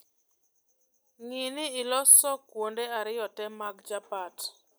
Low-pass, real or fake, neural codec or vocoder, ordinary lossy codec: none; real; none; none